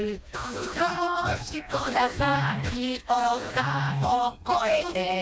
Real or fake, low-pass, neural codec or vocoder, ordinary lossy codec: fake; none; codec, 16 kHz, 1 kbps, FreqCodec, smaller model; none